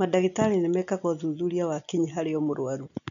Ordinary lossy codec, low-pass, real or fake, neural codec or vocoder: MP3, 96 kbps; 7.2 kHz; real; none